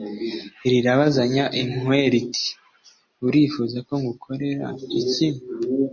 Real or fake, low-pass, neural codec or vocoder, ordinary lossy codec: real; 7.2 kHz; none; MP3, 32 kbps